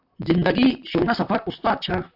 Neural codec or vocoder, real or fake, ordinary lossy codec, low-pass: vocoder, 44.1 kHz, 128 mel bands, Pupu-Vocoder; fake; AAC, 48 kbps; 5.4 kHz